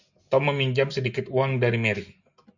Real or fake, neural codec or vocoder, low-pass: real; none; 7.2 kHz